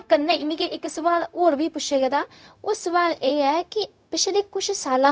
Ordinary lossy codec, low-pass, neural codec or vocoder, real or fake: none; none; codec, 16 kHz, 0.4 kbps, LongCat-Audio-Codec; fake